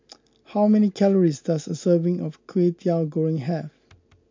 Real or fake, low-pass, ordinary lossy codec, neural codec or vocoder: real; 7.2 kHz; MP3, 48 kbps; none